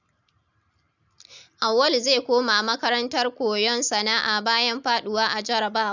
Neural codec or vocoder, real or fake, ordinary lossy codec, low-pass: none; real; none; 7.2 kHz